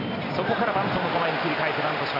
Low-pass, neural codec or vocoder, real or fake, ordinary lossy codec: 5.4 kHz; none; real; none